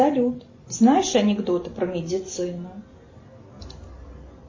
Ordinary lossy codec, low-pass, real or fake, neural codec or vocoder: MP3, 32 kbps; 7.2 kHz; real; none